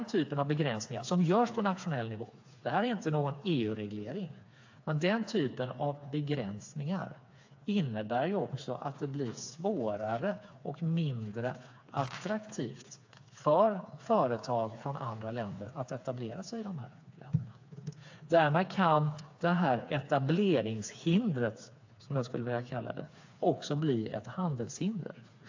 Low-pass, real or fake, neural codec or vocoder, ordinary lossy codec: 7.2 kHz; fake; codec, 16 kHz, 4 kbps, FreqCodec, smaller model; AAC, 48 kbps